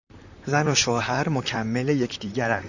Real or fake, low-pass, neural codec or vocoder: fake; 7.2 kHz; vocoder, 44.1 kHz, 128 mel bands, Pupu-Vocoder